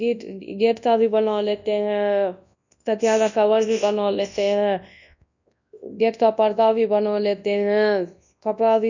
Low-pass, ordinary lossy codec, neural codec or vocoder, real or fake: 7.2 kHz; none; codec, 24 kHz, 0.9 kbps, WavTokenizer, large speech release; fake